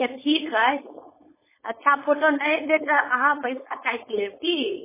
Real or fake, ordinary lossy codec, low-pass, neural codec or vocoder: fake; MP3, 16 kbps; 3.6 kHz; codec, 16 kHz, 4.8 kbps, FACodec